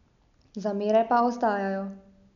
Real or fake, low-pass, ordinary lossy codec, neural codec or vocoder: real; 7.2 kHz; none; none